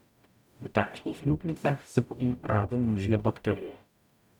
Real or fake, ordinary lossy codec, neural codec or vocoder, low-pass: fake; none; codec, 44.1 kHz, 0.9 kbps, DAC; 19.8 kHz